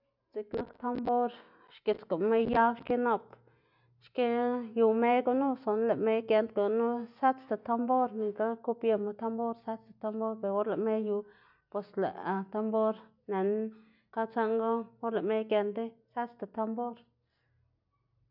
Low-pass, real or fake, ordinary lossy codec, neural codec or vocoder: 5.4 kHz; real; none; none